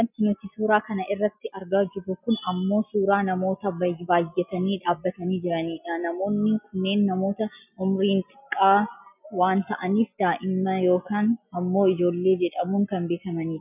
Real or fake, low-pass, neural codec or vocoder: real; 3.6 kHz; none